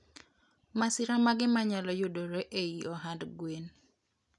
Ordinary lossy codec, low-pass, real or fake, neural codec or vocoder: none; 10.8 kHz; real; none